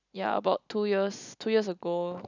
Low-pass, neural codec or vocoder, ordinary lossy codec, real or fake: 7.2 kHz; none; none; real